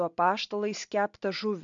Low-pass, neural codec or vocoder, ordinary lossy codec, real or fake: 7.2 kHz; none; MP3, 64 kbps; real